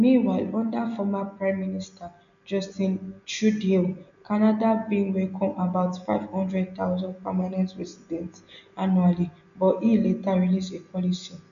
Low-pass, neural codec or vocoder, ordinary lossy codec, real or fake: 7.2 kHz; none; none; real